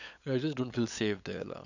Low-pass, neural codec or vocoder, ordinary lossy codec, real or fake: 7.2 kHz; codec, 16 kHz, 8 kbps, FunCodec, trained on LibriTTS, 25 frames a second; none; fake